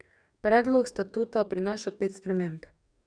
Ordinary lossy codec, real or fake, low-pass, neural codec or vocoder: none; fake; 9.9 kHz; codec, 44.1 kHz, 2.6 kbps, DAC